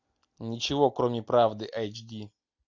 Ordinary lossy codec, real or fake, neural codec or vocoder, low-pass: MP3, 64 kbps; real; none; 7.2 kHz